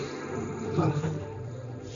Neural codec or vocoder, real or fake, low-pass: codec, 16 kHz, 1.1 kbps, Voila-Tokenizer; fake; 7.2 kHz